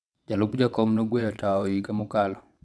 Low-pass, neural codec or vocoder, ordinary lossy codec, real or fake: none; vocoder, 22.05 kHz, 80 mel bands, WaveNeXt; none; fake